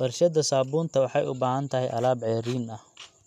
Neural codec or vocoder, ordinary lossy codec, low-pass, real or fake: none; none; 14.4 kHz; real